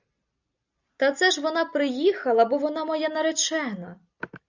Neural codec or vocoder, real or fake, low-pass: none; real; 7.2 kHz